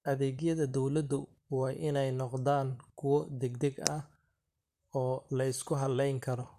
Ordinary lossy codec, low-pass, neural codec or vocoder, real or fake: AAC, 96 kbps; 14.4 kHz; vocoder, 44.1 kHz, 128 mel bands, Pupu-Vocoder; fake